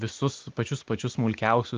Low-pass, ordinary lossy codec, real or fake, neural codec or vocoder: 7.2 kHz; Opus, 32 kbps; real; none